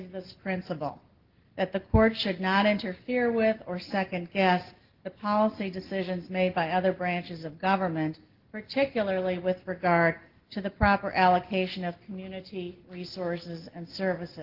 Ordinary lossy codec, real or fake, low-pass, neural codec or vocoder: Opus, 16 kbps; real; 5.4 kHz; none